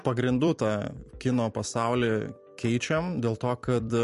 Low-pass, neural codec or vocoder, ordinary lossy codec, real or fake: 14.4 kHz; vocoder, 44.1 kHz, 128 mel bands every 256 samples, BigVGAN v2; MP3, 48 kbps; fake